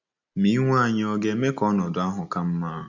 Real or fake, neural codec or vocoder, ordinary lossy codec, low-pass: real; none; none; 7.2 kHz